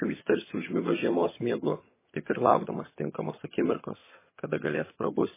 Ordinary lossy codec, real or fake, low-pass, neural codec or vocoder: MP3, 16 kbps; fake; 3.6 kHz; vocoder, 22.05 kHz, 80 mel bands, HiFi-GAN